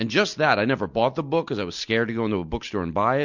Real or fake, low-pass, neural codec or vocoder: real; 7.2 kHz; none